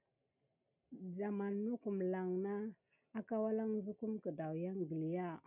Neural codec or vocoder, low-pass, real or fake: none; 3.6 kHz; real